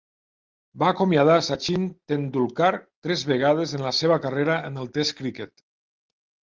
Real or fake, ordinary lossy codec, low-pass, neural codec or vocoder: real; Opus, 32 kbps; 7.2 kHz; none